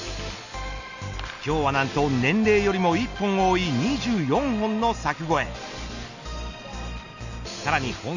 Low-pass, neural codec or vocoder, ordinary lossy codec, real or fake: 7.2 kHz; none; Opus, 64 kbps; real